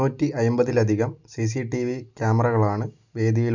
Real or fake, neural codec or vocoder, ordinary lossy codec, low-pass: real; none; none; 7.2 kHz